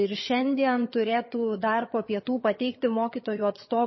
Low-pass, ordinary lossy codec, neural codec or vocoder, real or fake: 7.2 kHz; MP3, 24 kbps; vocoder, 22.05 kHz, 80 mel bands, HiFi-GAN; fake